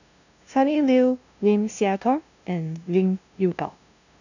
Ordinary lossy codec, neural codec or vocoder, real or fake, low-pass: none; codec, 16 kHz, 0.5 kbps, FunCodec, trained on LibriTTS, 25 frames a second; fake; 7.2 kHz